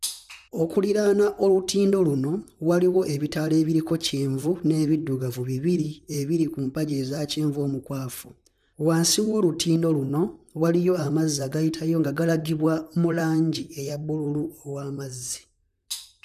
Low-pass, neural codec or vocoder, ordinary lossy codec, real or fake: 14.4 kHz; vocoder, 44.1 kHz, 128 mel bands, Pupu-Vocoder; none; fake